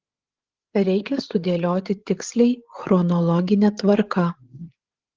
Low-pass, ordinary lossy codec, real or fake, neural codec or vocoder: 7.2 kHz; Opus, 16 kbps; fake; codec, 16 kHz, 8 kbps, FreqCodec, larger model